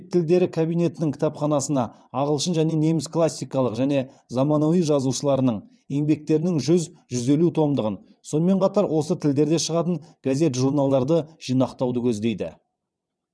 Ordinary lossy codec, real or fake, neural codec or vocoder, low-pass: none; fake; vocoder, 22.05 kHz, 80 mel bands, WaveNeXt; none